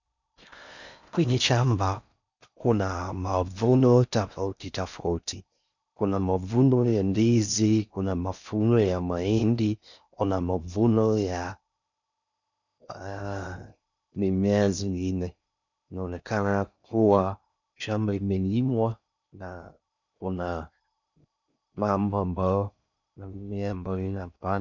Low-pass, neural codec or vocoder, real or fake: 7.2 kHz; codec, 16 kHz in and 24 kHz out, 0.6 kbps, FocalCodec, streaming, 4096 codes; fake